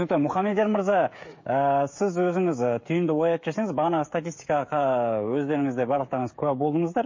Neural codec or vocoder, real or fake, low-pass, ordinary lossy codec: codec, 16 kHz, 16 kbps, FreqCodec, smaller model; fake; 7.2 kHz; MP3, 32 kbps